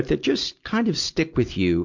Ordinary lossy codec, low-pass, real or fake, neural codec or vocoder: MP3, 64 kbps; 7.2 kHz; real; none